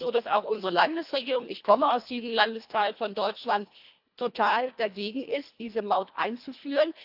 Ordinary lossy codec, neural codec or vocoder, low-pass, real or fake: AAC, 48 kbps; codec, 24 kHz, 1.5 kbps, HILCodec; 5.4 kHz; fake